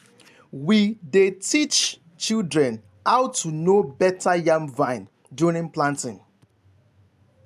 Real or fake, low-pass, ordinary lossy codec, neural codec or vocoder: real; 14.4 kHz; AAC, 96 kbps; none